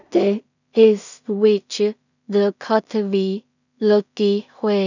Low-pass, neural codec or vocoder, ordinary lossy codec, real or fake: 7.2 kHz; codec, 16 kHz in and 24 kHz out, 0.4 kbps, LongCat-Audio-Codec, two codebook decoder; none; fake